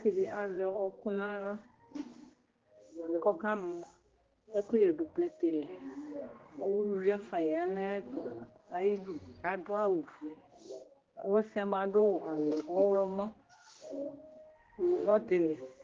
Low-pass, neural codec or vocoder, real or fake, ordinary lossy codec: 7.2 kHz; codec, 16 kHz, 1 kbps, X-Codec, HuBERT features, trained on general audio; fake; Opus, 16 kbps